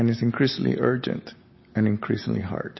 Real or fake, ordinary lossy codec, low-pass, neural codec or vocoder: real; MP3, 24 kbps; 7.2 kHz; none